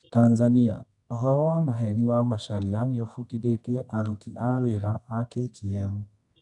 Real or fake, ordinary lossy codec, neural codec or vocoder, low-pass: fake; none; codec, 24 kHz, 0.9 kbps, WavTokenizer, medium music audio release; 10.8 kHz